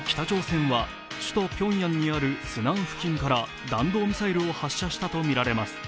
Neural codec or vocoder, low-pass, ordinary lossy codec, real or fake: none; none; none; real